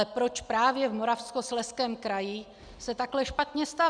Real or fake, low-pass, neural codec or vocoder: real; 9.9 kHz; none